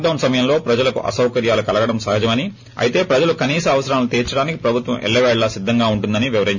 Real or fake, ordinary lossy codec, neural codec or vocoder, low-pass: real; none; none; 7.2 kHz